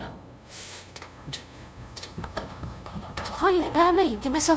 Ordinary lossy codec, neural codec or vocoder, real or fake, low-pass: none; codec, 16 kHz, 0.5 kbps, FunCodec, trained on LibriTTS, 25 frames a second; fake; none